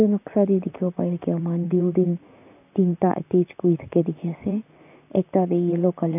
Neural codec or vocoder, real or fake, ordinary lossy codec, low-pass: vocoder, 44.1 kHz, 128 mel bands, Pupu-Vocoder; fake; none; 3.6 kHz